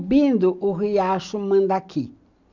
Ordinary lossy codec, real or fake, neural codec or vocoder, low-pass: none; real; none; 7.2 kHz